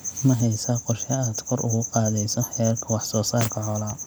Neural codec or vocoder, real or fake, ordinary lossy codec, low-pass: none; real; none; none